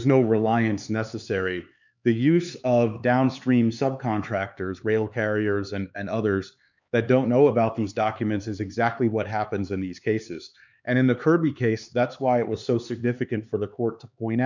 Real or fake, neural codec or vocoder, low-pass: fake; codec, 16 kHz, 4 kbps, X-Codec, HuBERT features, trained on LibriSpeech; 7.2 kHz